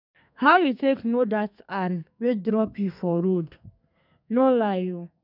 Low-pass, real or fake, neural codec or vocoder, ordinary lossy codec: 5.4 kHz; fake; codec, 32 kHz, 1.9 kbps, SNAC; none